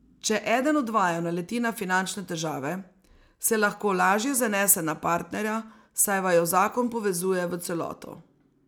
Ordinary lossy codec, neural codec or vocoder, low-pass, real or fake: none; none; none; real